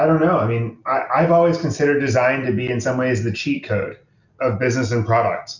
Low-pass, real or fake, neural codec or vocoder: 7.2 kHz; real; none